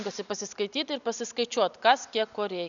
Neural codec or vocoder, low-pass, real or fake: none; 7.2 kHz; real